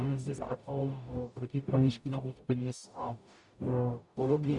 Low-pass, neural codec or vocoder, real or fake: 10.8 kHz; codec, 44.1 kHz, 0.9 kbps, DAC; fake